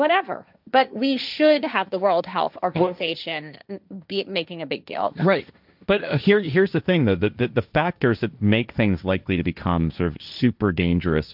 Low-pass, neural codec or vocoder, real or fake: 5.4 kHz; codec, 16 kHz, 1.1 kbps, Voila-Tokenizer; fake